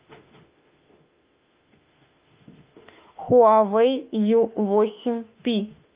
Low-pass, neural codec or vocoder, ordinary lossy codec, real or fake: 3.6 kHz; autoencoder, 48 kHz, 32 numbers a frame, DAC-VAE, trained on Japanese speech; Opus, 24 kbps; fake